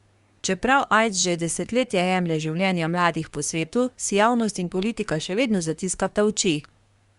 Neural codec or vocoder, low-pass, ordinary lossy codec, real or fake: codec, 24 kHz, 1 kbps, SNAC; 10.8 kHz; Opus, 64 kbps; fake